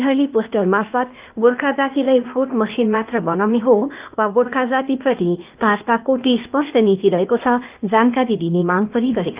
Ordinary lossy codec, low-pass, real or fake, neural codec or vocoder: Opus, 24 kbps; 3.6 kHz; fake; codec, 16 kHz, 0.8 kbps, ZipCodec